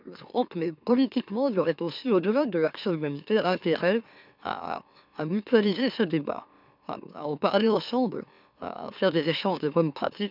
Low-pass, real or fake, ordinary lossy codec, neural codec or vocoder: 5.4 kHz; fake; none; autoencoder, 44.1 kHz, a latent of 192 numbers a frame, MeloTTS